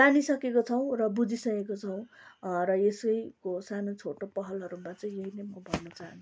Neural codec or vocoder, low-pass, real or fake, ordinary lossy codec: none; none; real; none